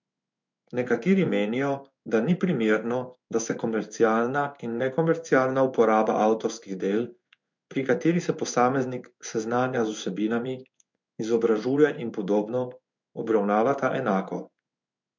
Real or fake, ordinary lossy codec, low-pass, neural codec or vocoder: fake; MP3, 64 kbps; 7.2 kHz; codec, 16 kHz in and 24 kHz out, 1 kbps, XY-Tokenizer